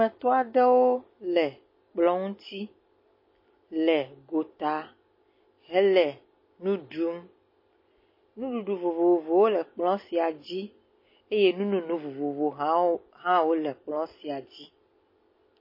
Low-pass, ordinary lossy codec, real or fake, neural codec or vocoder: 5.4 kHz; MP3, 24 kbps; real; none